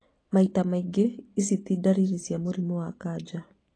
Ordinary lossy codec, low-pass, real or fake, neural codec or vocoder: AAC, 32 kbps; 9.9 kHz; real; none